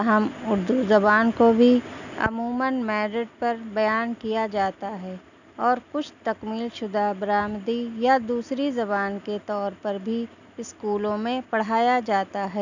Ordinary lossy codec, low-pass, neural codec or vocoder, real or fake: none; 7.2 kHz; none; real